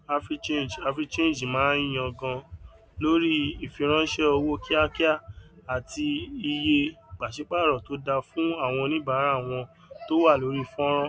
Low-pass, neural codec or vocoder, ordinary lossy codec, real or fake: none; none; none; real